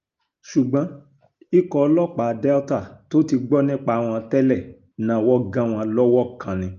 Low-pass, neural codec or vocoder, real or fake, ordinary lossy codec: 7.2 kHz; none; real; Opus, 24 kbps